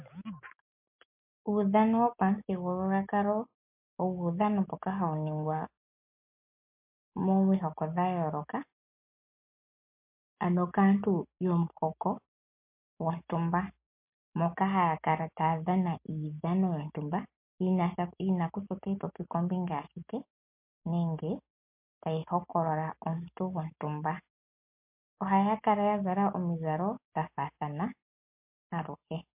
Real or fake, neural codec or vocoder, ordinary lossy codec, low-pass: real; none; MP3, 32 kbps; 3.6 kHz